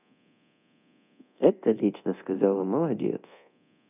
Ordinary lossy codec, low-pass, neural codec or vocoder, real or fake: none; 3.6 kHz; codec, 24 kHz, 0.9 kbps, DualCodec; fake